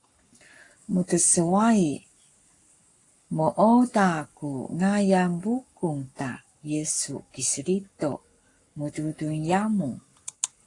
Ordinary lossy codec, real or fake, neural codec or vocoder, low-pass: AAC, 48 kbps; fake; codec, 44.1 kHz, 7.8 kbps, Pupu-Codec; 10.8 kHz